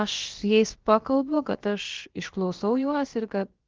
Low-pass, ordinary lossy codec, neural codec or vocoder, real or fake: 7.2 kHz; Opus, 16 kbps; codec, 16 kHz, about 1 kbps, DyCAST, with the encoder's durations; fake